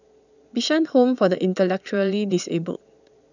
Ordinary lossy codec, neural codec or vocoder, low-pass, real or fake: none; vocoder, 22.05 kHz, 80 mel bands, Vocos; 7.2 kHz; fake